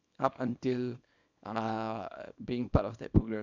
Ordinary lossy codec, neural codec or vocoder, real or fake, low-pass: none; codec, 24 kHz, 0.9 kbps, WavTokenizer, small release; fake; 7.2 kHz